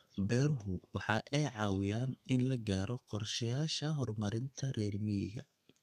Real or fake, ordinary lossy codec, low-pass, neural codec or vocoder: fake; none; 14.4 kHz; codec, 32 kHz, 1.9 kbps, SNAC